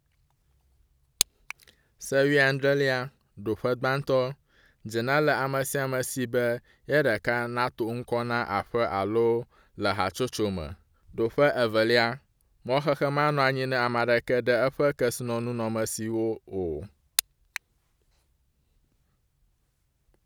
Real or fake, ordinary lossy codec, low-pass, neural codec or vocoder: real; none; none; none